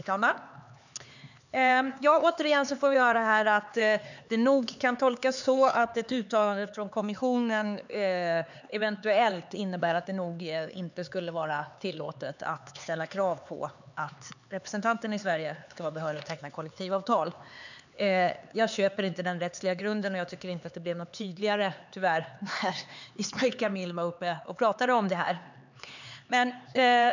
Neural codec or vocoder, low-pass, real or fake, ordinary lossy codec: codec, 16 kHz, 4 kbps, X-Codec, HuBERT features, trained on LibriSpeech; 7.2 kHz; fake; none